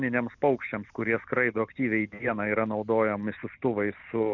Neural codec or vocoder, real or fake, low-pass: none; real; 7.2 kHz